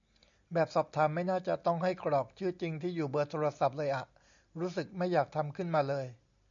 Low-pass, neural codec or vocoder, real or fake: 7.2 kHz; none; real